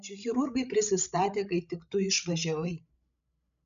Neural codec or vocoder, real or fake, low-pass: codec, 16 kHz, 16 kbps, FreqCodec, larger model; fake; 7.2 kHz